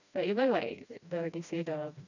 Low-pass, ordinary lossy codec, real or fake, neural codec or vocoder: 7.2 kHz; none; fake; codec, 16 kHz, 1 kbps, FreqCodec, smaller model